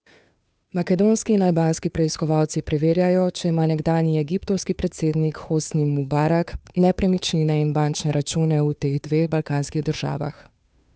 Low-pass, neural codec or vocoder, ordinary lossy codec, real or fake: none; codec, 16 kHz, 2 kbps, FunCodec, trained on Chinese and English, 25 frames a second; none; fake